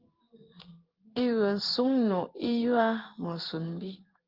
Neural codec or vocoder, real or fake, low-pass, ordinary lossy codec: codec, 16 kHz in and 24 kHz out, 1 kbps, XY-Tokenizer; fake; 5.4 kHz; Opus, 32 kbps